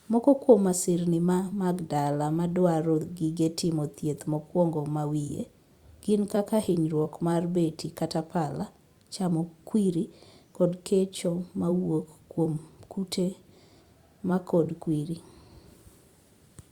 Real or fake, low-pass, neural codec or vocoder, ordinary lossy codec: fake; 19.8 kHz; vocoder, 44.1 kHz, 128 mel bands every 256 samples, BigVGAN v2; Opus, 64 kbps